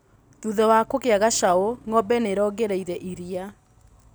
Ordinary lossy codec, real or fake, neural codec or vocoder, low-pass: none; real; none; none